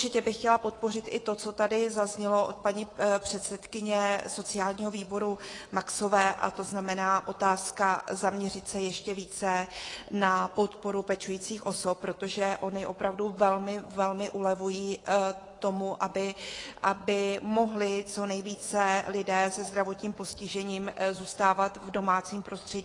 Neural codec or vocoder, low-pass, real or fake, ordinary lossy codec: codec, 24 kHz, 3.1 kbps, DualCodec; 10.8 kHz; fake; AAC, 32 kbps